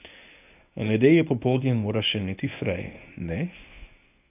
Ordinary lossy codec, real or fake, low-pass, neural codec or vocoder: none; fake; 3.6 kHz; codec, 24 kHz, 0.9 kbps, WavTokenizer, medium speech release version 1